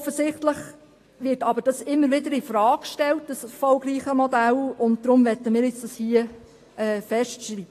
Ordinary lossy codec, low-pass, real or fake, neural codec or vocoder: AAC, 48 kbps; 14.4 kHz; real; none